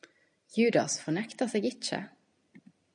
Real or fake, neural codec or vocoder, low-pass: real; none; 9.9 kHz